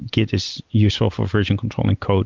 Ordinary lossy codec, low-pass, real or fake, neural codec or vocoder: Opus, 24 kbps; 7.2 kHz; real; none